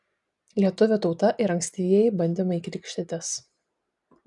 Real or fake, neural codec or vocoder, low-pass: real; none; 10.8 kHz